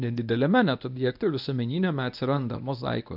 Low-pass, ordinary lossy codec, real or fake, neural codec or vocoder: 5.4 kHz; AAC, 48 kbps; fake; codec, 24 kHz, 0.9 kbps, WavTokenizer, medium speech release version 1